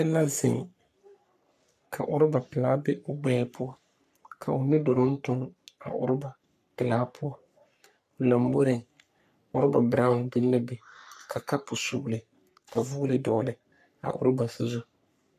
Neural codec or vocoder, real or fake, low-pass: codec, 44.1 kHz, 3.4 kbps, Pupu-Codec; fake; 14.4 kHz